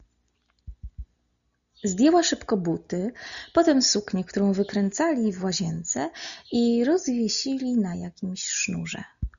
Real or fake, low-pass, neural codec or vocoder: real; 7.2 kHz; none